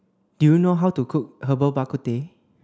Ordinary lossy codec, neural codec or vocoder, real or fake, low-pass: none; none; real; none